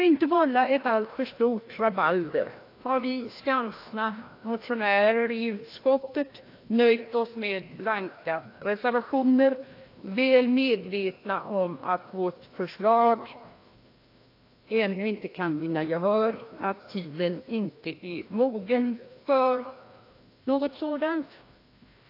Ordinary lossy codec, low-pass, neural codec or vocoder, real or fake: AAC, 32 kbps; 5.4 kHz; codec, 16 kHz, 1 kbps, FreqCodec, larger model; fake